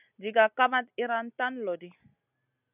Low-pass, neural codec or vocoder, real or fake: 3.6 kHz; none; real